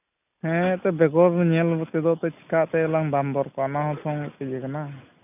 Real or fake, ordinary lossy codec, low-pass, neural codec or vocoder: real; none; 3.6 kHz; none